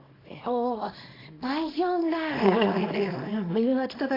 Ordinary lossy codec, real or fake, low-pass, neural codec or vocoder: AAC, 24 kbps; fake; 5.4 kHz; codec, 24 kHz, 0.9 kbps, WavTokenizer, small release